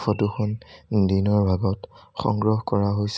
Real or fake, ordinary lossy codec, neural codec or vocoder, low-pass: real; none; none; none